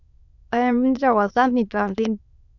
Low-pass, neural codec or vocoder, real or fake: 7.2 kHz; autoencoder, 22.05 kHz, a latent of 192 numbers a frame, VITS, trained on many speakers; fake